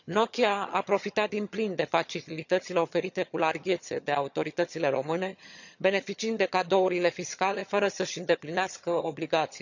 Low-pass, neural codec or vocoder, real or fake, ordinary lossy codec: 7.2 kHz; vocoder, 22.05 kHz, 80 mel bands, HiFi-GAN; fake; none